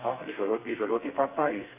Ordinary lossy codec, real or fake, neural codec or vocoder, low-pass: none; fake; codec, 32 kHz, 1.9 kbps, SNAC; 3.6 kHz